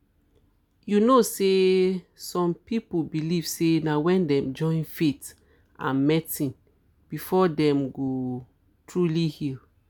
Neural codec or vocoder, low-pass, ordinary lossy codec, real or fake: none; none; none; real